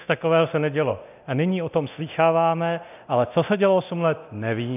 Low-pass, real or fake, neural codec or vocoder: 3.6 kHz; fake; codec, 24 kHz, 0.9 kbps, DualCodec